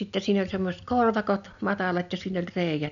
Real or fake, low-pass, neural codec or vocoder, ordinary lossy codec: real; 7.2 kHz; none; none